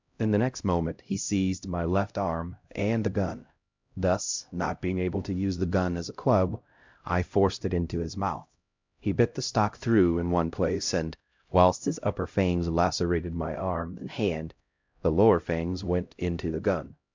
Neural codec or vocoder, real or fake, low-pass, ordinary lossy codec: codec, 16 kHz, 0.5 kbps, X-Codec, HuBERT features, trained on LibriSpeech; fake; 7.2 kHz; MP3, 64 kbps